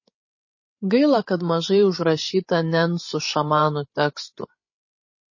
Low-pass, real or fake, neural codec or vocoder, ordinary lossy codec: 7.2 kHz; fake; codec, 16 kHz, 16 kbps, FreqCodec, larger model; MP3, 32 kbps